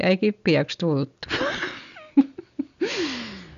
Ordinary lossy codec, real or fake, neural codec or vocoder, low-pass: none; real; none; 7.2 kHz